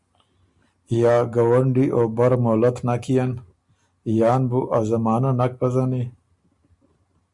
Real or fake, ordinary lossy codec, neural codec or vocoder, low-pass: real; Opus, 64 kbps; none; 10.8 kHz